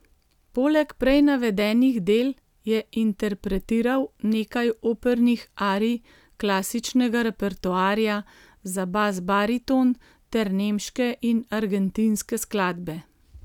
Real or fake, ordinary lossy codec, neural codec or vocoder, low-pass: real; none; none; 19.8 kHz